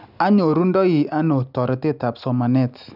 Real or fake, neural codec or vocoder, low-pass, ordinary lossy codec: real; none; 5.4 kHz; none